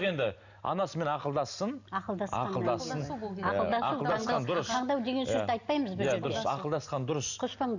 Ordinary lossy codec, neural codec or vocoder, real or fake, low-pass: none; none; real; 7.2 kHz